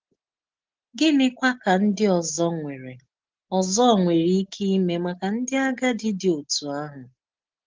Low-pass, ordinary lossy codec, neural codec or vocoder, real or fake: 7.2 kHz; Opus, 16 kbps; none; real